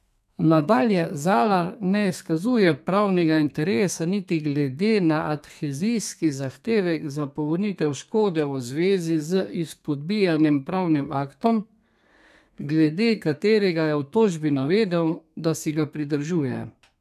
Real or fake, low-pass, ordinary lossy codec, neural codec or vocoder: fake; 14.4 kHz; none; codec, 32 kHz, 1.9 kbps, SNAC